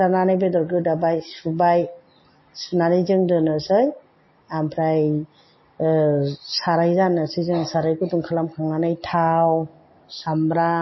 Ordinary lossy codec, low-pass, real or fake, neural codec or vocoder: MP3, 24 kbps; 7.2 kHz; real; none